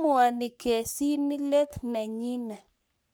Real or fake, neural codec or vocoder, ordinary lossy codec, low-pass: fake; codec, 44.1 kHz, 3.4 kbps, Pupu-Codec; none; none